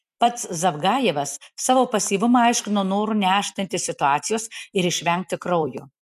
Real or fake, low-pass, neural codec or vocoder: fake; 14.4 kHz; vocoder, 44.1 kHz, 128 mel bands every 256 samples, BigVGAN v2